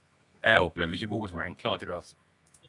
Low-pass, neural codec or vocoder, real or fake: 10.8 kHz; codec, 24 kHz, 0.9 kbps, WavTokenizer, medium music audio release; fake